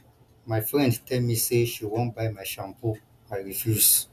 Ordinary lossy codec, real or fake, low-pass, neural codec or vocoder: none; real; 14.4 kHz; none